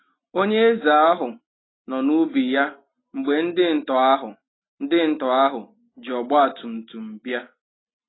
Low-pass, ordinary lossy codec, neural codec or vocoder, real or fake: 7.2 kHz; AAC, 16 kbps; none; real